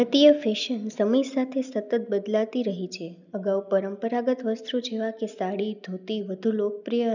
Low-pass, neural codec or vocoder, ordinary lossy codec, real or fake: 7.2 kHz; none; none; real